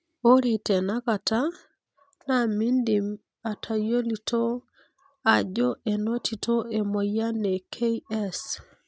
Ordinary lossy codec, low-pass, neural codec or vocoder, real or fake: none; none; none; real